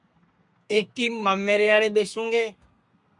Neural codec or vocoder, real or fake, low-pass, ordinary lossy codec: codec, 32 kHz, 1.9 kbps, SNAC; fake; 10.8 kHz; MP3, 96 kbps